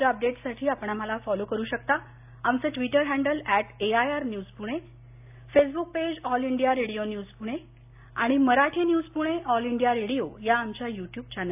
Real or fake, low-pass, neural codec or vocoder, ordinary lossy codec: real; 3.6 kHz; none; AAC, 32 kbps